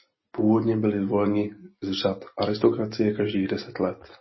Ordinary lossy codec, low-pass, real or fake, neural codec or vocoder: MP3, 24 kbps; 7.2 kHz; real; none